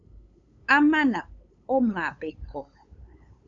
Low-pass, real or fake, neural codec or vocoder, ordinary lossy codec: 7.2 kHz; fake; codec, 16 kHz, 8 kbps, FunCodec, trained on LibriTTS, 25 frames a second; AAC, 64 kbps